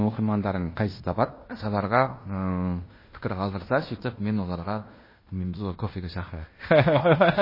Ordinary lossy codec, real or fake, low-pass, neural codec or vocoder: MP3, 24 kbps; fake; 5.4 kHz; codec, 16 kHz in and 24 kHz out, 0.9 kbps, LongCat-Audio-Codec, fine tuned four codebook decoder